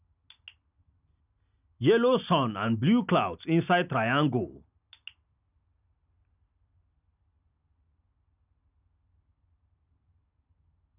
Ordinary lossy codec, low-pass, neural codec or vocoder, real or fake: none; 3.6 kHz; none; real